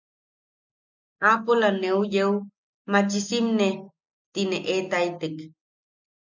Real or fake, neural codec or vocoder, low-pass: real; none; 7.2 kHz